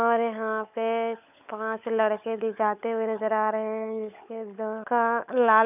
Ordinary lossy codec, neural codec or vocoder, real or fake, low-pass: none; codec, 16 kHz, 16 kbps, FunCodec, trained on LibriTTS, 50 frames a second; fake; 3.6 kHz